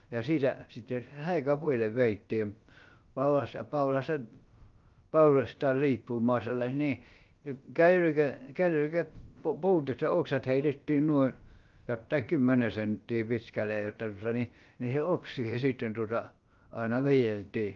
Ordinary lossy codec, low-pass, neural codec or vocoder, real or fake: Opus, 32 kbps; 7.2 kHz; codec, 16 kHz, about 1 kbps, DyCAST, with the encoder's durations; fake